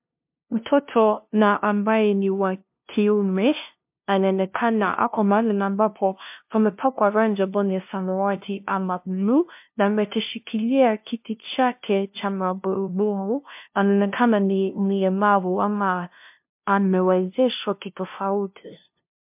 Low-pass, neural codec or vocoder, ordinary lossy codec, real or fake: 3.6 kHz; codec, 16 kHz, 0.5 kbps, FunCodec, trained on LibriTTS, 25 frames a second; MP3, 32 kbps; fake